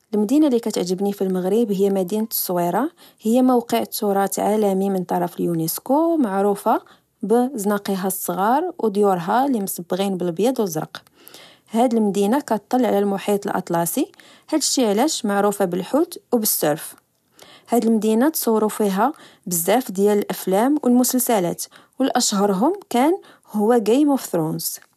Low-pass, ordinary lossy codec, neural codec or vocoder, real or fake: 14.4 kHz; none; none; real